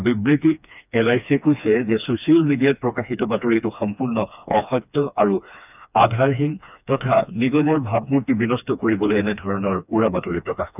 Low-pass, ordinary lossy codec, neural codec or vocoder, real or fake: 3.6 kHz; none; codec, 16 kHz, 2 kbps, FreqCodec, smaller model; fake